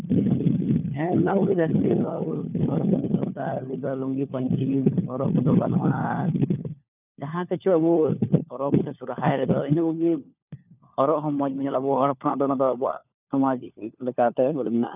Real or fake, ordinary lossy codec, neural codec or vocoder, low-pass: fake; none; codec, 16 kHz, 4 kbps, FunCodec, trained on LibriTTS, 50 frames a second; 3.6 kHz